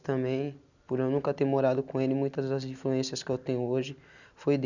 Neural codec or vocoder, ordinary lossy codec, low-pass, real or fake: none; none; 7.2 kHz; real